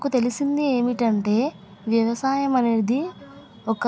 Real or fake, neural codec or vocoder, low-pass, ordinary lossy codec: real; none; none; none